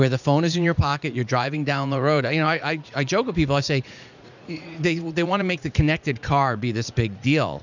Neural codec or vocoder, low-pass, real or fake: vocoder, 44.1 kHz, 80 mel bands, Vocos; 7.2 kHz; fake